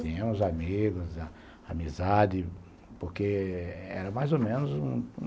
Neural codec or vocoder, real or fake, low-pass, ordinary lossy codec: none; real; none; none